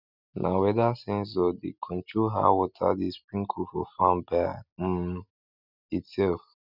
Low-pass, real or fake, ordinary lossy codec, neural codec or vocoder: 5.4 kHz; real; none; none